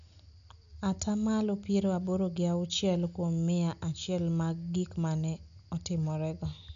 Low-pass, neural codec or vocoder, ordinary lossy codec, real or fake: 7.2 kHz; none; none; real